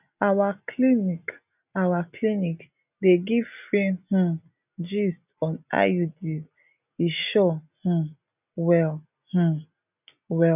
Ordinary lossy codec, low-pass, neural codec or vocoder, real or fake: none; 3.6 kHz; none; real